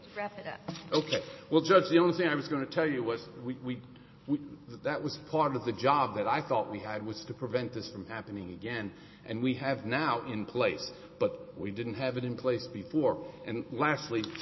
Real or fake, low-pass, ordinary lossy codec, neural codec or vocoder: real; 7.2 kHz; MP3, 24 kbps; none